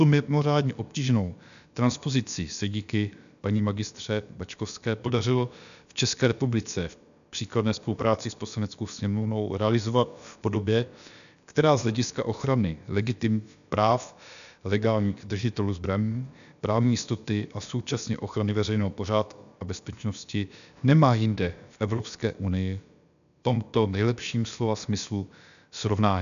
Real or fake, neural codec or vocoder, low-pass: fake; codec, 16 kHz, about 1 kbps, DyCAST, with the encoder's durations; 7.2 kHz